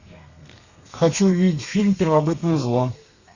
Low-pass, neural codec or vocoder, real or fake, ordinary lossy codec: 7.2 kHz; codec, 32 kHz, 1.9 kbps, SNAC; fake; Opus, 64 kbps